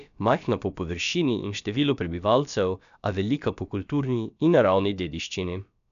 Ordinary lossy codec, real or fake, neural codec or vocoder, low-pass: none; fake; codec, 16 kHz, about 1 kbps, DyCAST, with the encoder's durations; 7.2 kHz